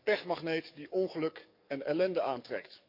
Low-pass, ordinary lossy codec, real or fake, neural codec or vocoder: 5.4 kHz; none; fake; codec, 44.1 kHz, 7.8 kbps, DAC